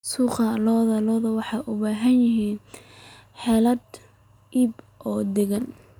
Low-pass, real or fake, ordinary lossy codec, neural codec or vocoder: 19.8 kHz; real; none; none